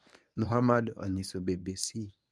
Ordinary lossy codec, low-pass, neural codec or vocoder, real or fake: none; none; codec, 24 kHz, 0.9 kbps, WavTokenizer, medium speech release version 1; fake